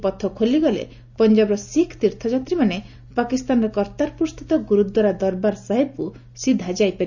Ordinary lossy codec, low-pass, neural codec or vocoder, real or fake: none; 7.2 kHz; none; real